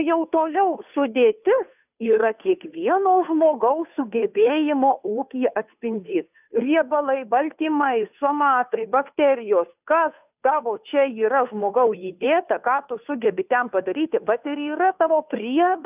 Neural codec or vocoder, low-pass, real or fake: codec, 16 kHz, 2 kbps, FunCodec, trained on Chinese and English, 25 frames a second; 3.6 kHz; fake